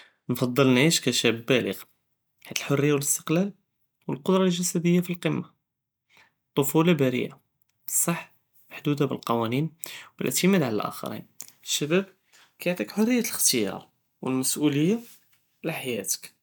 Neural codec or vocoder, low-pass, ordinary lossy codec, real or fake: none; none; none; real